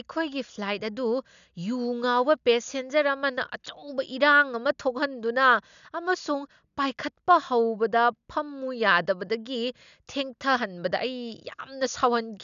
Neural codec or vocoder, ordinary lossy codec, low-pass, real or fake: none; none; 7.2 kHz; real